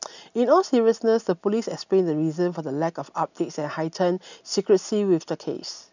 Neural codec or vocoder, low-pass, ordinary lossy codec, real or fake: none; 7.2 kHz; none; real